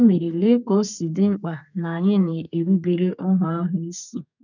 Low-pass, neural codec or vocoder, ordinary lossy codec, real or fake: 7.2 kHz; codec, 32 kHz, 1.9 kbps, SNAC; none; fake